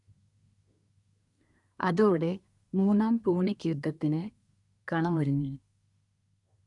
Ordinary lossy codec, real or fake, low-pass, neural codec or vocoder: none; fake; 10.8 kHz; codec, 24 kHz, 1 kbps, SNAC